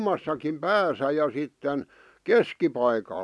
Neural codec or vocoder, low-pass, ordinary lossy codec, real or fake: none; none; none; real